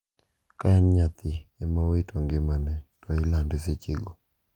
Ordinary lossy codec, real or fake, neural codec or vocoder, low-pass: Opus, 32 kbps; real; none; 19.8 kHz